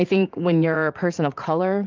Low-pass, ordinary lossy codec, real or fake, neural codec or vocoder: 7.2 kHz; Opus, 24 kbps; fake; vocoder, 44.1 kHz, 80 mel bands, Vocos